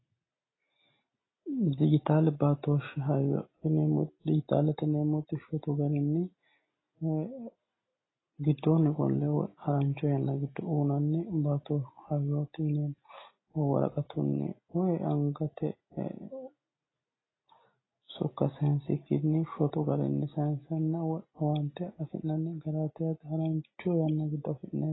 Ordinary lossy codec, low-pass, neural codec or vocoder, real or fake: AAC, 16 kbps; 7.2 kHz; none; real